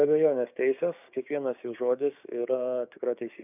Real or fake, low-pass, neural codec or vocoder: fake; 3.6 kHz; codec, 16 kHz, 6 kbps, DAC